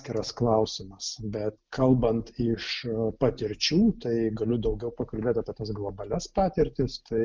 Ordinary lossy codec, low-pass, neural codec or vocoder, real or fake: Opus, 24 kbps; 7.2 kHz; none; real